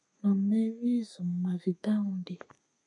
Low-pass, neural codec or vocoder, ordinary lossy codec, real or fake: 10.8 kHz; codec, 32 kHz, 1.9 kbps, SNAC; MP3, 64 kbps; fake